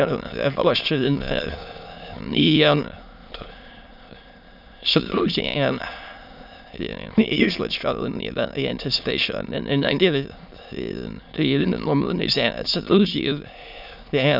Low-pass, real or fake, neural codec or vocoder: 5.4 kHz; fake; autoencoder, 22.05 kHz, a latent of 192 numbers a frame, VITS, trained on many speakers